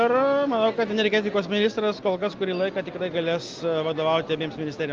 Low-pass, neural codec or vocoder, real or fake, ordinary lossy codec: 7.2 kHz; none; real; Opus, 32 kbps